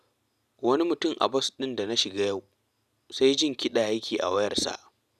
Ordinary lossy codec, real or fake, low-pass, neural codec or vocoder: none; real; 14.4 kHz; none